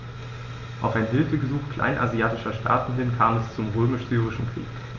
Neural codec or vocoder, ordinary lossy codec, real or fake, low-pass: none; Opus, 32 kbps; real; 7.2 kHz